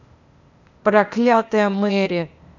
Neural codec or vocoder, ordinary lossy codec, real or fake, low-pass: codec, 16 kHz, 0.8 kbps, ZipCodec; none; fake; 7.2 kHz